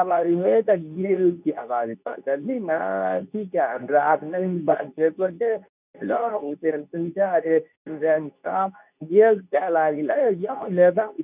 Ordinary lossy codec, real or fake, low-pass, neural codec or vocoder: none; fake; 3.6 kHz; codec, 24 kHz, 0.9 kbps, WavTokenizer, medium speech release version 2